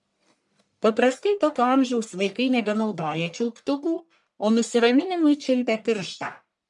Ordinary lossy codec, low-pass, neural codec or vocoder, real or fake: MP3, 96 kbps; 10.8 kHz; codec, 44.1 kHz, 1.7 kbps, Pupu-Codec; fake